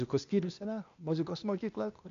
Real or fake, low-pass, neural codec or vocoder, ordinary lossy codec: fake; 7.2 kHz; codec, 16 kHz, 0.8 kbps, ZipCodec; MP3, 64 kbps